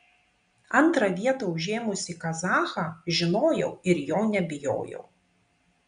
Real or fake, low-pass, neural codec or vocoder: real; 9.9 kHz; none